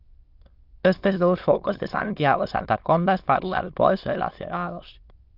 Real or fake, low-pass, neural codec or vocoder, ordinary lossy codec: fake; 5.4 kHz; autoencoder, 22.05 kHz, a latent of 192 numbers a frame, VITS, trained on many speakers; Opus, 24 kbps